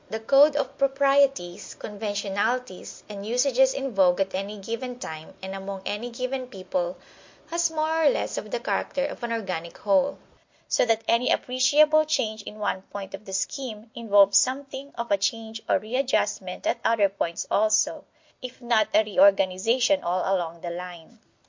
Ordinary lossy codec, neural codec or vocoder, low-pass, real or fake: MP3, 48 kbps; none; 7.2 kHz; real